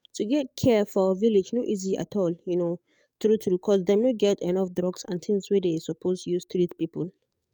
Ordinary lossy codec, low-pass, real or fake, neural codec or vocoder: none; 19.8 kHz; fake; codec, 44.1 kHz, 7.8 kbps, DAC